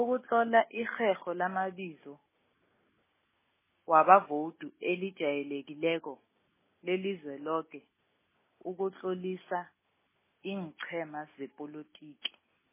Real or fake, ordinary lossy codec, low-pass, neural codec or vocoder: real; MP3, 16 kbps; 3.6 kHz; none